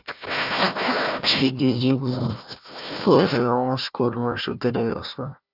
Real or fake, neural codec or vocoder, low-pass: fake; codec, 16 kHz, 1 kbps, FunCodec, trained on Chinese and English, 50 frames a second; 5.4 kHz